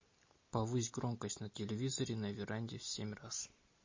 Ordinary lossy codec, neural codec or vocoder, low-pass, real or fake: MP3, 32 kbps; none; 7.2 kHz; real